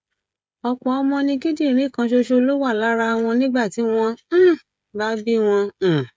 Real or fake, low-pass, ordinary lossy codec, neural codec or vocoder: fake; none; none; codec, 16 kHz, 16 kbps, FreqCodec, smaller model